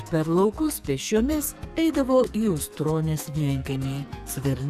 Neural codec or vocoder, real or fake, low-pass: codec, 44.1 kHz, 2.6 kbps, SNAC; fake; 14.4 kHz